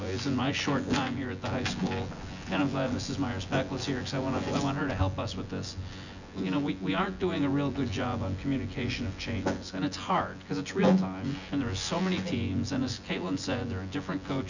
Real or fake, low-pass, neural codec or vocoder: fake; 7.2 kHz; vocoder, 24 kHz, 100 mel bands, Vocos